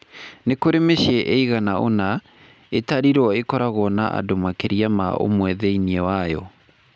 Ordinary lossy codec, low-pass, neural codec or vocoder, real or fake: none; none; none; real